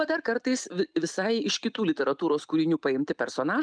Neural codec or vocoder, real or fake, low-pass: none; real; 9.9 kHz